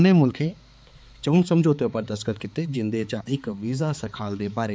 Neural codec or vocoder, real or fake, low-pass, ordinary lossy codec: codec, 16 kHz, 4 kbps, X-Codec, HuBERT features, trained on balanced general audio; fake; none; none